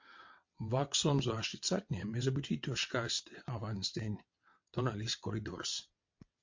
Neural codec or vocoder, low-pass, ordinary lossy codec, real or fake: vocoder, 22.05 kHz, 80 mel bands, WaveNeXt; 7.2 kHz; MP3, 48 kbps; fake